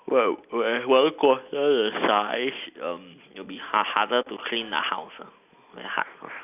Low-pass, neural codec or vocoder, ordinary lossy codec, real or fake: 3.6 kHz; none; none; real